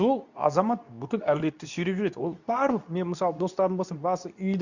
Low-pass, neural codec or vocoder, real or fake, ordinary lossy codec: 7.2 kHz; codec, 24 kHz, 0.9 kbps, WavTokenizer, medium speech release version 2; fake; none